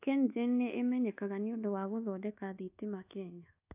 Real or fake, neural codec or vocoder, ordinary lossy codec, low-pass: fake; codec, 24 kHz, 1.2 kbps, DualCodec; AAC, 32 kbps; 3.6 kHz